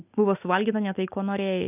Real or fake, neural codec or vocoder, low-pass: real; none; 3.6 kHz